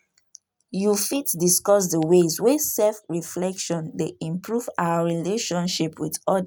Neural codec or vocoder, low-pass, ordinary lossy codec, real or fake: none; none; none; real